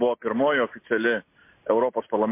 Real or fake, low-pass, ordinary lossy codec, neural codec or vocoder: real; 3.6 kHz; MP3, 24 kbps; none